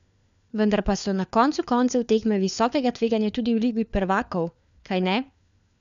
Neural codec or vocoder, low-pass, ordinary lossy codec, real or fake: codec, 16 kHz, 4 kbps, FunCodec, trained on LibriTTS, 50 frames a second; 7.2 kHz; none; fake